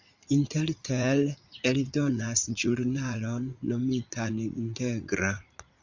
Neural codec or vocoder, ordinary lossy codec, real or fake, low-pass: vocoder, 44.1 kHz, 80 mel bands, Vocos; Opus, 64 kbps; fake; 7.2 kHz